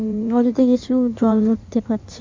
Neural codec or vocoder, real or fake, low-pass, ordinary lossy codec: codec, 16 kHz in and 24 kHz out, 1.1 kbps, FireRedTTS-2 codec; fake; 7.2 kHz; MP3, 64 kbps